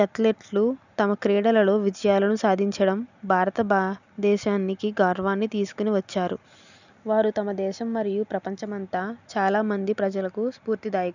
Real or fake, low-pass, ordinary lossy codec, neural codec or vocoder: real; 7.2 kHz; none; none